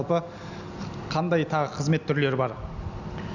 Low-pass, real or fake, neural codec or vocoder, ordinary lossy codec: 7.2 kHz; real; none; none